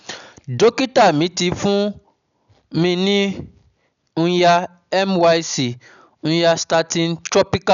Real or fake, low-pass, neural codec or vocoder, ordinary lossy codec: real; 7.2 kHz; none; none